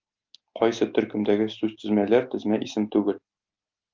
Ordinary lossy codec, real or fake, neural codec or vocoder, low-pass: Opus, 24 kbps; real; none; 7.2 kHz